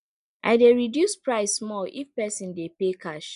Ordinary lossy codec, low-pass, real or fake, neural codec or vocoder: MP3, 96 kbps; 10.8 kHz; real; none